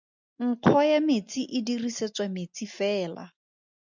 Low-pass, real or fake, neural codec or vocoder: 7.2 kHz; real; none